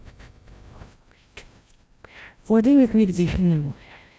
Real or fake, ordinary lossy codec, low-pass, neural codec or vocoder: fake; none; none; codec, 16 kHz, 0.5 kbps, FreqCodec, larger model